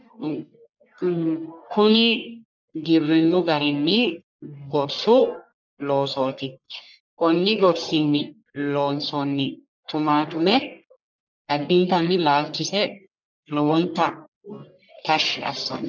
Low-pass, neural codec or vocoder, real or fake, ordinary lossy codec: 7.2 kHz; codec, 44.1 kHz, 1.7 kbps, Pupu-Codec; fake; MP3, 64 kbps